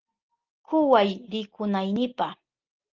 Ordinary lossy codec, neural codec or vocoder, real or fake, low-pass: Opus, 16 kbps; none; real; 7.2 kHz